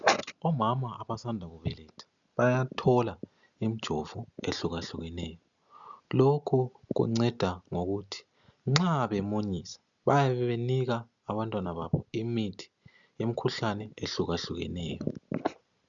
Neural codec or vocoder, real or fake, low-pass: none; real; 7.2 kHz